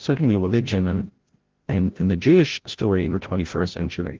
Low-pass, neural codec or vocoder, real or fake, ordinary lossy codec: 7.2 kHz; codec, 16 kHz, 0.5 kbps, FreqCodec, larger model; fake; Opus, 16 kbps